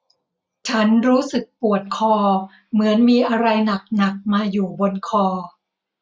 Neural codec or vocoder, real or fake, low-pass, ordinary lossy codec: none; real; none; none